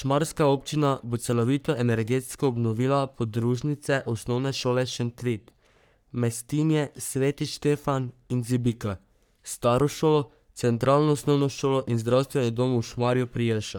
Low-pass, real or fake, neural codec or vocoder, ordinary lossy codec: none; fake; codec, 44.1 kHz, 3.4 kbps, Pupu-Codec; none